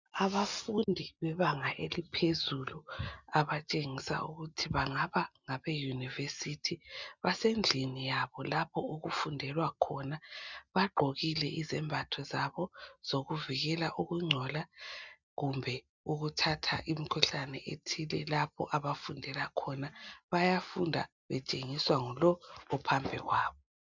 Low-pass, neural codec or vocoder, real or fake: 7.2 kHz; none; real